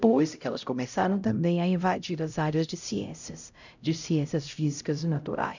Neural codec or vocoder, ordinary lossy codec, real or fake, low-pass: codec, 16 kHz, 0.5 kbps, X-Codec, HuBERT features, trained on LibriSpeech; none; fake; 7.2 kHz